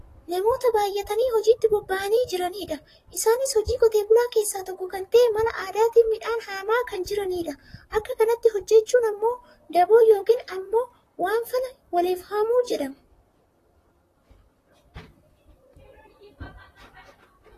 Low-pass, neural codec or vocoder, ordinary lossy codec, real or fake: 14.4 kHz; vocoder, 44.1 kHz, 128 mel bands, Pupu-Vocoder; AAC, 48 kbps; fake